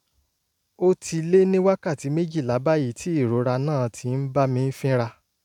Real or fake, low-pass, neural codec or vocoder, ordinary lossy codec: real; 19.8 kHz; none; none